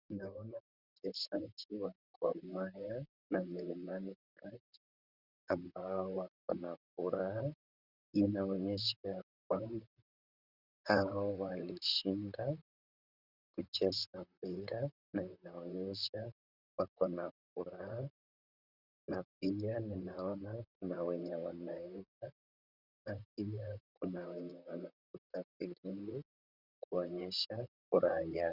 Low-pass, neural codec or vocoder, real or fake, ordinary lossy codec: 5.4 kHz; vocoder, 44.1 kHz, 128 mel bands, Pupu-Vocoder; fake; Opus, 64 kbps